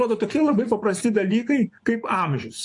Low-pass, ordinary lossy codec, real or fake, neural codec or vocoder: 10.8 kHz; AAC, 64 kbps; fake; vocoder, 24 kHz, 100 mel bands, Vocos